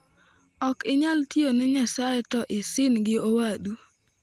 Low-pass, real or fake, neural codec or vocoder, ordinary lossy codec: 14.4 kHz; real; none; Opus, 24 kbps